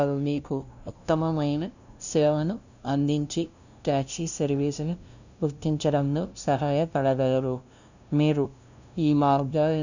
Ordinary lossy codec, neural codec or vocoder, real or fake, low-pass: none; codec, 16 kHz, 0.5 kbps, FunCodec, trained on LibriTTS, 25 frames a second; fake; 7.2 kHz